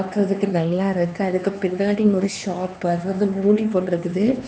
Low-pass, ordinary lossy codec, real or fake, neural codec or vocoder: none; none; fake; codec, 16 kHz, 2 kbps, X-Codec, HuBERT features, trained on LibriSpeech